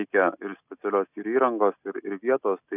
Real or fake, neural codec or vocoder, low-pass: real; none; 3.6 kHz